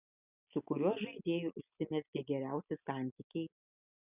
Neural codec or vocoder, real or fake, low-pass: none; real; 3.6 kHz